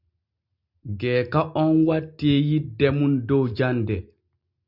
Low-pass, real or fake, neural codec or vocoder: 5.4 kHz; real; none